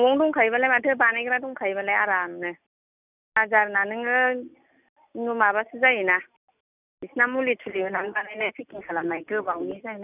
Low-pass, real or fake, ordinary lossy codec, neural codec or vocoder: 3.6 kHz; real; none; none